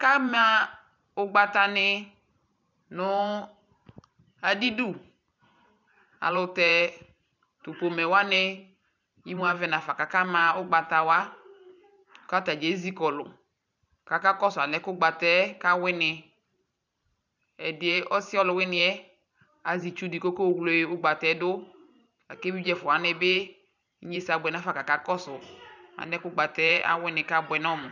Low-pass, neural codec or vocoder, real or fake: 7.2 kHz; vocoder, 44.1 kHz, 128 mel bands every 512 samples, BigVGAN v2; fake